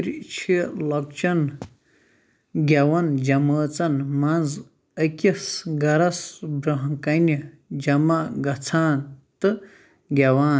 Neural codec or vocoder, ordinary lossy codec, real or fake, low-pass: none; none; real; none